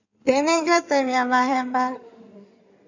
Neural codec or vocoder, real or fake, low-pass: codec, 16 kHz in and 24 kHz out, 2.2 kbps, FireRedTTS-2 codec; fake; 7.2 kHz